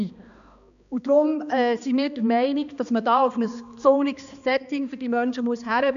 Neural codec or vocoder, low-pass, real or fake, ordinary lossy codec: codec, 16 kHz, 2 kbps, X-Codec, HuBERT features, trained on balanced general audio; 7.2 kHz; fake; none